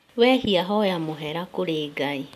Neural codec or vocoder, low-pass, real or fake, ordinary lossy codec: none; 14.4 kHz; real; none